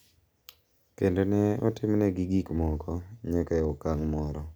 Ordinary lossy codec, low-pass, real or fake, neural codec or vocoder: none; none; real; none